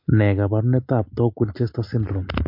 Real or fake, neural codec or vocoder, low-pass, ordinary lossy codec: real; none; 5.4 kHz; MP3, 32 kbps